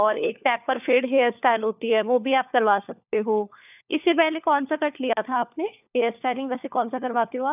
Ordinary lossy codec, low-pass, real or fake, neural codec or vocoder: none; 3.6 kHz; fake; codec, 16 kHz, 4 kbps, FunCodec, trained on LibriTTS, 50 frames a second